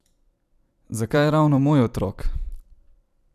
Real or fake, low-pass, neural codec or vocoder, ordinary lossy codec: fake; 14.4 kHz; vocoder, 44.1 kHz, 128 mel bands every 256 samples, BigVGAN v2; none